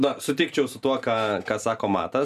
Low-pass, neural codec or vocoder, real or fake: 14.4 kHz; none; real